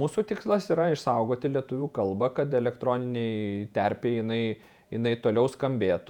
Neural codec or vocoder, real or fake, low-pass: vocoder, 48 kHz, 128 mel bands, Vocos; fake; 19.8 kHz